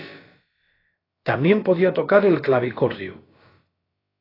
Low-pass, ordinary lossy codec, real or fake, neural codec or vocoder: 5.4 kHz; AAC, 24 kbps; fake; codec, 16 kHz, about 1 kbps, DyCAST, with the encoder's durations